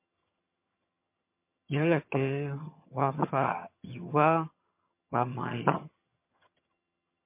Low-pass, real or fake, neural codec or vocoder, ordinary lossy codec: 3.6 kHz; fake; vocoder, 22.05 kHz, 80 mel bands, HiFi-GAN; MP3, 24 kbps